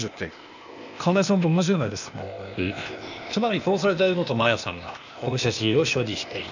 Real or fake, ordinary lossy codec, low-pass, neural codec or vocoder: fake; none; 7.2 kHz; codec, 16 kHz, 0.8 kbps, ZipCodec